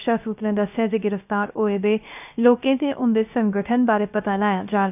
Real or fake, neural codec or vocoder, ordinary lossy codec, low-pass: fake; codec, 16 kHz, 0.3 kbps, FocalCodec; none; 3.6 kHz